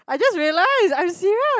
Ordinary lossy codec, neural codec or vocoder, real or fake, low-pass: none; none; real; none